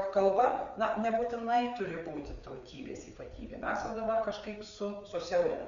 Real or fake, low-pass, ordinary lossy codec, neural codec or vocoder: fake; 7.2 kHz; MP3, 96 kbps; codec, 16 kHz, 4 kbps, FreqCodec, larger model